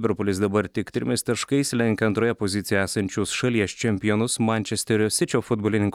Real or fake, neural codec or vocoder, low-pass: real; none; 19.8 kHz